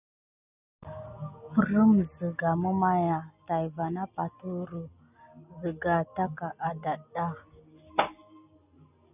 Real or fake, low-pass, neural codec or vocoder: real; 3.6 kHz; none